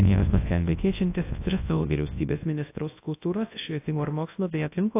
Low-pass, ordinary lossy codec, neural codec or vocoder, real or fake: 3.6 kHz; AAC, 24 kbps; codec, 24 kHz, 0.9 kbps, WavTokenizer, large speech release; fake